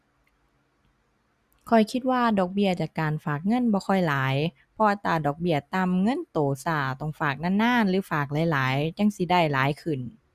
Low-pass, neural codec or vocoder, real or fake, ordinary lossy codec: 14.4 kHz; none; real; Opus, 64 kbps